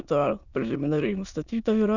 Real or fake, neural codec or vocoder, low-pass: fake; autoencoder, 22.05 kHz, a latent of 192 numbers a frame, VITS, trained on many speakers; 7.2 kHz